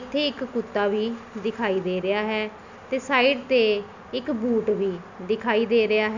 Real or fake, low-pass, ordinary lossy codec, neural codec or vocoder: real; 7.2 kHz; none; none